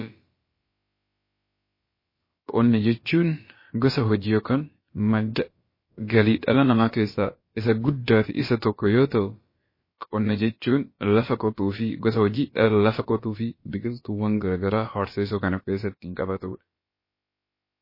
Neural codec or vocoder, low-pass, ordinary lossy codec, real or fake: codec, 16 kHz, about 1 kbps, DyCAST, with the encoder's durations; 5.4 kHz; MP3, 24 kbps; fake